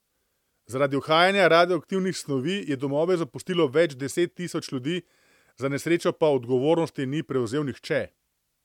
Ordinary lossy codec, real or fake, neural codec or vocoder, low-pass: MP3, 96 kbps; real; none; 19.8 kHz